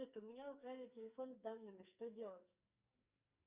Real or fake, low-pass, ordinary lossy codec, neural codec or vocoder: fake; 3.6 kHz; Opus, 64 kbps; codec, 44.1 kHz, 2.6 kbps, SNAC